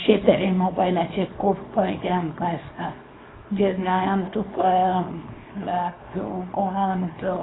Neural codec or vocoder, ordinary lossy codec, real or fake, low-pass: codec, 24 kHz, 0.9 kbps, WavTokenizer, small release; AAC, 16 kbps; fake; 7.2 kHz